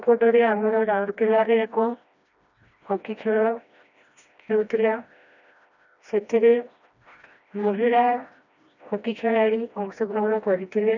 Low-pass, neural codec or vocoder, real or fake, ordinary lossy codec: 7.2 kHz; codec, 16 kHz, 1 kbps, FreqCodec, smaller model; fake; none